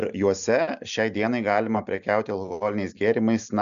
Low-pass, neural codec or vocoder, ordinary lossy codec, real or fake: 7.2 kHz; none; MP3, 96 kbps; real